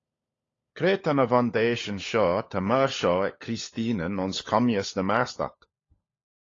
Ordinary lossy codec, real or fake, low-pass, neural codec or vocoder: AAC, 32 kbps; fake; 7.2 kHz; codec, 16 kHz, 16 kbps, FunCodec, trained on LibriTTS, 50 frames a second